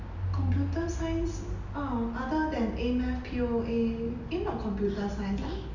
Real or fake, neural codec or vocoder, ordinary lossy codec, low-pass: real; none; none; 7.2 kHz